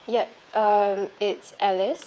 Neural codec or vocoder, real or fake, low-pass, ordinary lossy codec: codec, 16 kHz, 8 kbps, FreqCodec, smaller model; fake; none; none